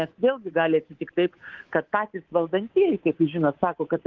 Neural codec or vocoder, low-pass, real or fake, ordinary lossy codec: codec, 24 kHz, 3.1 kbps, DualCodec; 7.2 kHz; fake; Opus, 16 kbps